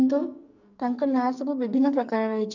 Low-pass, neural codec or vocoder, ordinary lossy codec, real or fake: 7.2 kHz; codec, 44.1 kHz, 2.6 kbps, SNAC; none; fake